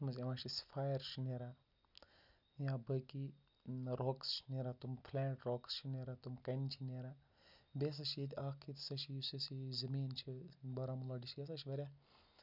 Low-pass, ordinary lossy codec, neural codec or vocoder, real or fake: 5.4 kHz; none; none; real